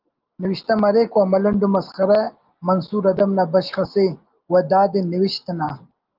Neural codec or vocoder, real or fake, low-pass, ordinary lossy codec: none; real; 5.4 kHz; Opus, 32 kbps